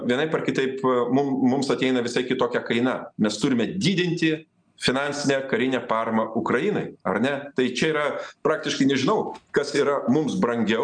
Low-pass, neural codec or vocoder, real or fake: 9.9 kHz; none; real